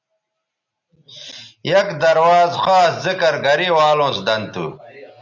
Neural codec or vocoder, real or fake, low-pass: none; real; 7.2 kHz